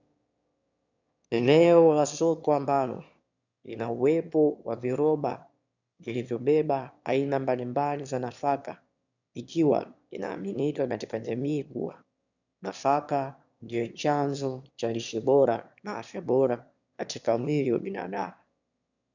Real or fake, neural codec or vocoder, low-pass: fake; autoencoder, 22.05 kHz, a latent of 192 numbers a frame, VITS, trained on one speaker; 7.2 kHz